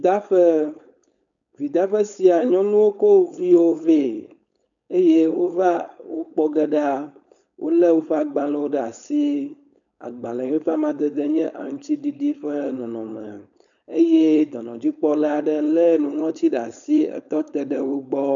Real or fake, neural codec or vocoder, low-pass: fake; codec, 16 kHz, 4.8 kbps, FACodec; 7.2 kHz